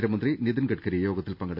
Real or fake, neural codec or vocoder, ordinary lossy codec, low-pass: real; none; none; 5.4 kHz